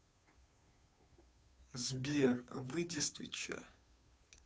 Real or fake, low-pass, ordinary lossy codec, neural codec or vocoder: fake; none; none; codec, 16 kHz, 2 kbps, FunCodec, trained on Chinese and English, 25 frames a second